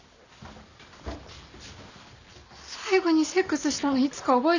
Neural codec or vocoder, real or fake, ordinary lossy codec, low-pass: none; real; none; 7.2 kHz